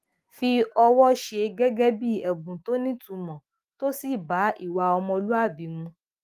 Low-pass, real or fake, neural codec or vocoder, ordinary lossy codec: 14.4 kHz; fake; autoencoder, 48 kHz, 128 numbers a frame, DAC-VAE, trained on Japanese speech; Opus, 24 kbps